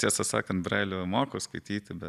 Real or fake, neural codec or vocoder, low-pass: real; none; 14.4 kHz